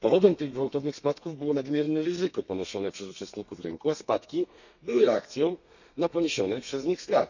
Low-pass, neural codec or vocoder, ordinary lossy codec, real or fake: 7.2 kHz; codec, 32 kHz, 1.9 kbps, SNAC; none; fake